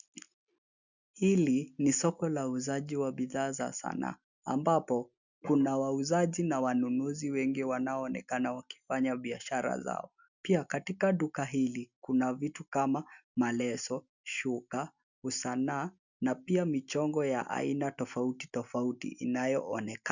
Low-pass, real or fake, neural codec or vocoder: 7.2 kHz; real; none